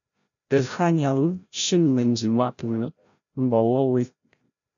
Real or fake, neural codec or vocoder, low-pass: fake; codec, 16 kHz, 0.5 kbps, FreqCodec, larger model; 7.2 kHz